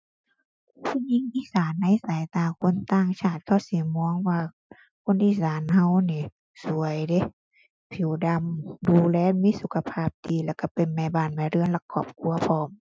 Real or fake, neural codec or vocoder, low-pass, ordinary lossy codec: real; none; none; none